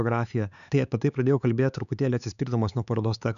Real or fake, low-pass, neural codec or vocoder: fake; 7.2 kHz; codec, 16 kHz, 4 kbps, X-Codec, HuBERT features, trained on LibriSpeech